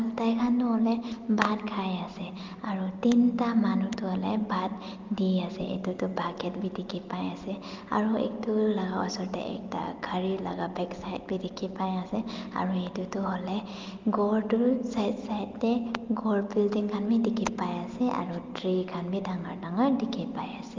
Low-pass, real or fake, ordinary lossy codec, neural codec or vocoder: 7.2 kHz; real; Opus, 24 kbps; none